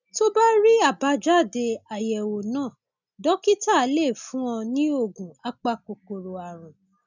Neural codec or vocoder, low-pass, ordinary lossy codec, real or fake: none; 7.2 kHz; none; real